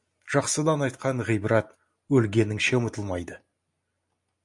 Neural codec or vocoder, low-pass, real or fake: none; 10.8 kHz; real